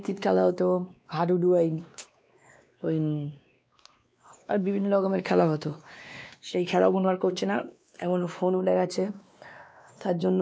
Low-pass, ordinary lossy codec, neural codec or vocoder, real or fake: none; none; codec, 16 kHz, 2 kbps, X-Codec, WavLM features, trained on Multilingual LibriSpeech; fake